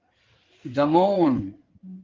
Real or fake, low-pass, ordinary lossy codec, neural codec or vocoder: fake; 7.2 kHz; Opus, 16 kbps; codec, 16 kHz in and 24 kHz out, 2.2 kbps, FireRedTTS-2 codec